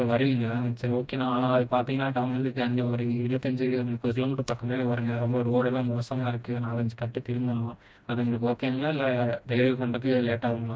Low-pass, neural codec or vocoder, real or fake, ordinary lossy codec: none; codec, 16 kHz, 1 kbps, FreqCodec, smaller model; fake; none